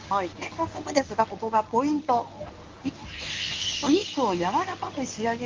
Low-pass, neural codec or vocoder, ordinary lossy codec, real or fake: 7.2 kHz; codec, 24 kHz, 0.9 kbps, WavTokenizer, medium speech release version 1; Opus, 24 kbps; fake